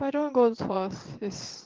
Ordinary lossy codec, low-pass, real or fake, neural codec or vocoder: Opus, 16 kbps; 7.2 kHz; fake; vocoder, 22.05 kHz, 80 mel bands, WaveNeXt